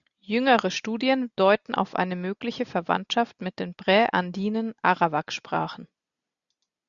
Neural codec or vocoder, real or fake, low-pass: none; real; 7.2 kHz